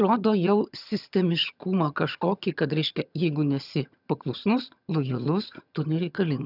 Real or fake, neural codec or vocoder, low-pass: fake; vocoder, 22.05 kHz, 80 mel bands, HiFi-GAN; 5.4 kHz